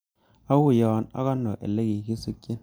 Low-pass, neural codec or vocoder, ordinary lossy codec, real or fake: none; none; none; real